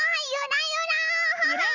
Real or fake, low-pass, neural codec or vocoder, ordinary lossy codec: real; 7.2 kHz; none; none